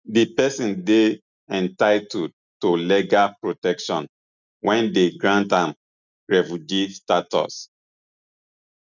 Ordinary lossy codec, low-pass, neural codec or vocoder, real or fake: none; 7.2 kHz; none; real